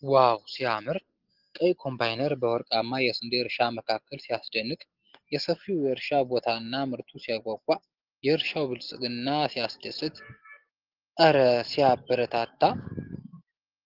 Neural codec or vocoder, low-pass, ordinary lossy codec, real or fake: none; 5.4 kHz; Opus, 16 kbps; real